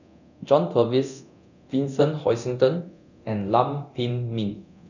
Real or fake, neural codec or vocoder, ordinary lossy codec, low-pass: fake; codec, 24 kHz, 0.9 kbps, DualCodec; none; 7.2 kHz